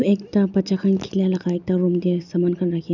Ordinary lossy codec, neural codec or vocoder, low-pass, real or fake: none; none; 7.2 kHz; real